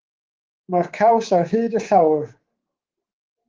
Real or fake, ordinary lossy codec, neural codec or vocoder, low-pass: fake; Opus, 16 kbps; codec, 24 kHz, 3.1 kbps, DualCodec; 7.2 kHz